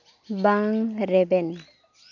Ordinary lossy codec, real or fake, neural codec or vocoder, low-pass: none; real; none; 7.2 kHz